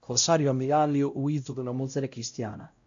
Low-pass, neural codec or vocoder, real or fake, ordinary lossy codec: 7.2 kHz; codec, 16 kHz, 0.5 kbps, X-Codec, WavLM features, trained on Multilingual LibriSpeech; fake; AAC, 64 kbps